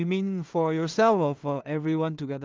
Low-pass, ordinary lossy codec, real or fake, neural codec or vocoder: 7.2 kHz; Opus, 24 kbps; fake; codec, 16 kHz in and 24 kHz out, 0.4 kbps, LongCat-Audio-Codec, two codebook decoder